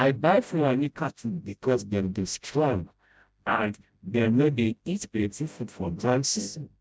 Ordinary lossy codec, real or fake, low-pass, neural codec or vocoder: none; fake; none; codec, 16 kHz, 0.5 kbps, FreqCodec, smaller model